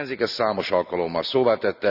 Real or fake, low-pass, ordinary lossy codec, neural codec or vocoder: real; 5.4 kHz; none; none